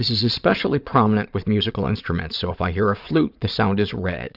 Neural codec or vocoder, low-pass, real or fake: vocoder, 22.05 kHz, 80 mel bands, Vocos; 5.4 kHz; fake